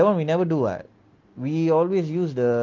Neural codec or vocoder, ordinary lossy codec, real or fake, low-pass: none; Opus, 32 kbps; real; 7.2 kHz